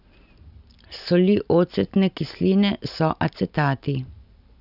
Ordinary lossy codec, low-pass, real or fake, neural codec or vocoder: none; 5.4 kHz; real; none